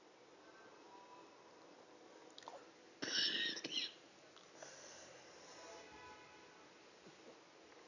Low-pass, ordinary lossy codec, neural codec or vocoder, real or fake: 7.2 kHz; none; none; real